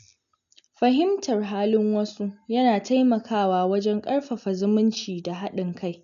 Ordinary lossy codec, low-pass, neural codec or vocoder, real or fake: none; 7.2 kHz; none; real